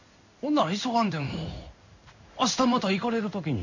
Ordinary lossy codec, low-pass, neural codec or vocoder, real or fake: none; 7.2 kHz; codec, 16 kHz in and 24 kHz out, 1 kbps, XY-Tokenizer; fake